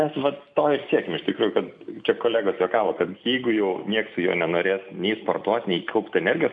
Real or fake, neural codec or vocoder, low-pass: real; none; 9.9 kHz